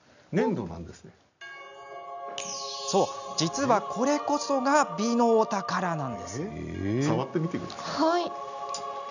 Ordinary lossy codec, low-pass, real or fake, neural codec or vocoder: none; 7.2 kHz; real; none